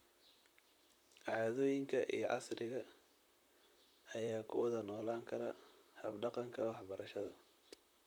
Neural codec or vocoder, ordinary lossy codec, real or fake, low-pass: vocoder, 44.1 kHz, 128 mel bands, Pupu-Vocoder; none; fake; none